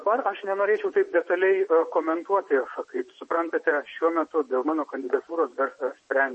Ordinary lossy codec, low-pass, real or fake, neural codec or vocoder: MP3, 32 kbps; 10.8 kHz; fake; vocoder, 24 kHz, 100 mel bands, Vocos